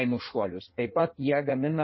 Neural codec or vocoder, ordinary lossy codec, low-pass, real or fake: codec, 16 kHz in and 24 kHz out, 1.1 kbps, FireRedTTS-2 codec; MP3, 24 kbps; 7.2 kHz; fake